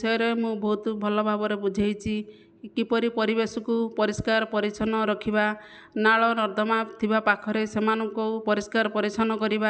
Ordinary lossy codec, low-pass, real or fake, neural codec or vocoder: none; none; real; none